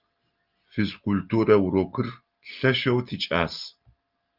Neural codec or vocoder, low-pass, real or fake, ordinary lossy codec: none; 5.4 kHz; real; Opus, 24 kbps